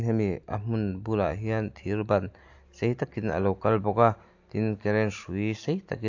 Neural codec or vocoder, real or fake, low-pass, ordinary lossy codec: none; real; 7.2 kHz; AAC, 48 kbps